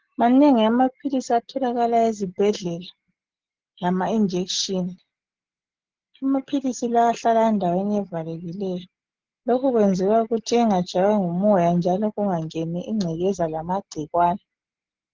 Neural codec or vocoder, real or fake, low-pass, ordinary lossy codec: none; real; 7.2 kHz; Opus, 16 kbps